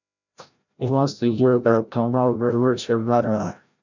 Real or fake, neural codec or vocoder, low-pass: fake; codec, 16 kHz, 0.5 kbps, FreqCodec, larger model; 7.2 kHz